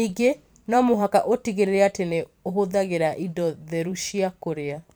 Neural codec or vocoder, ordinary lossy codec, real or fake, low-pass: vocoder, 44.1 kHz, 128 mel bands every 512 samples, BigVGAN v2; none; fake; none